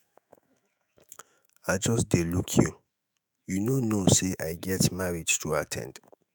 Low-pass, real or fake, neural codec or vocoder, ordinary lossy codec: none; fake; autoencoder, 48 kHz, 128 numbers a frame, DAC-VAE, trained on Japanese speech; none